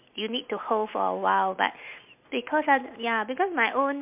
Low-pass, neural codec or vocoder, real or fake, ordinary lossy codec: 3.6 kHz; none; real; MP3, 32 kbps